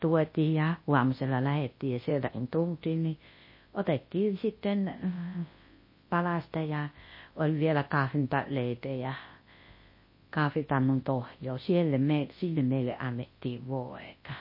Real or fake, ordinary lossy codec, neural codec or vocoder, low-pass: fake; MP3, 24 kbps; codec, 24 kHz, 0.9 kbps, WavTokenizer, large speech release; 5.4 kHz